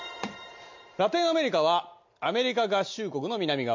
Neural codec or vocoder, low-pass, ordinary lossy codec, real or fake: none; 7.2 kHz; MP3, 48 kbps; real